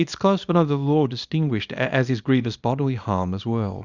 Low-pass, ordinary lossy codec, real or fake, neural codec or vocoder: 7.2 kHz; Opus, 64 kbps; fake; codec, 24 kHz, 0.9 kbps, WavTokenizer, small release